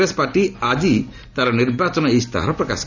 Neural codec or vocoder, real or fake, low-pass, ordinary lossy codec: none; real; 7.2 kHz; none